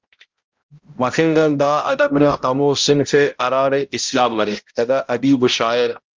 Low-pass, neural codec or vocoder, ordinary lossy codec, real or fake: 7.2 kHz; codec, 16 kHz, 0.5 kbps, X-Codec, HuBERT features, trained on balanced general audio; Opus, 32 kbps; fake